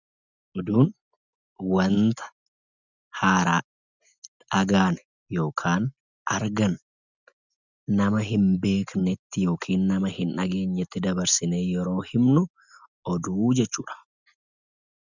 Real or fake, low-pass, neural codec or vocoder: real; 7.2 kHz; none